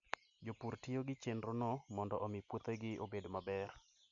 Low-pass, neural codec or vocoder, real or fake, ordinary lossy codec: 7.2 kHz; none; real; none